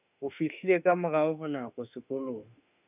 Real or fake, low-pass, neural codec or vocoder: fake; 3.6 kHz; autoencoder, 48 kHz, 32 numbers a frame, DAC-VAE, trained on Japanese speech